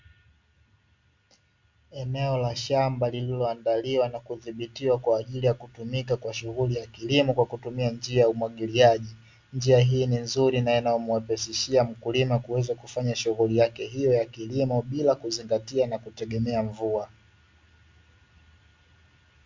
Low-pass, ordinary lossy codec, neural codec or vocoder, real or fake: 7.2 kHz; MP3, 64 kbps; none; real